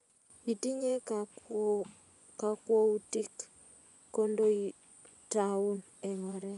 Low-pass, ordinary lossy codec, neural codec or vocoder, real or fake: 10.8 kHz; none; vocoder, 24 kHz, 100 mel bands, Vocos; fake